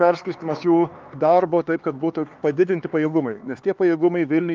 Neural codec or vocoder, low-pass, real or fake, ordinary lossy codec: codec, 16 kHz, 4 kbps, X-Codec, HuBERT features, trained on LibriSpeech; 7.2 kHz; fake; Opus, 24 kbps